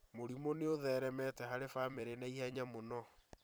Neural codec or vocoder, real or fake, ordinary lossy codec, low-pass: vocoder, 44.1 kHz, 128 mel bands every 256 samples, BigVGAN v2; fake; none; none